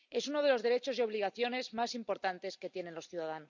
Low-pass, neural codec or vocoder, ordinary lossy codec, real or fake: 7.2 kHz; none; none; real